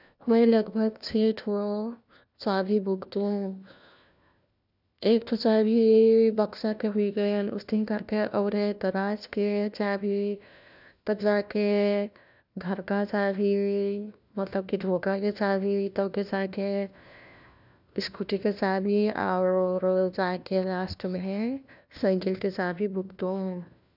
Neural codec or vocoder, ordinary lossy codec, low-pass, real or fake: codec, 16 kHz, 1 kbps, FunCodec, trained on LibriTTS, 50 frames a second; none; 5.4 kHz; fake